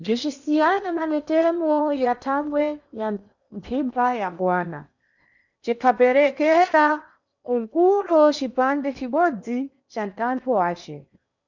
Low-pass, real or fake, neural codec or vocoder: 7.2 kHz; fake; codec, 16 kHz in and 24 kHz out, 0.8 kbps, FocalCodec, streaming, 65536 codes